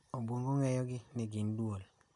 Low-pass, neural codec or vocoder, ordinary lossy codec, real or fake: 10.8 kHz; none; none; real